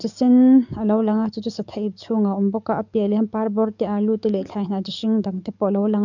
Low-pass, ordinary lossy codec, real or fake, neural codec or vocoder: 7.2 kHz; none; fake; codec, 44.1 kHz, 7.8 kbps, DAC